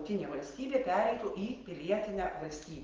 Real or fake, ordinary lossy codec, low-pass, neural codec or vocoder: real; Opus, 16 kbps; 7.2 kHz; none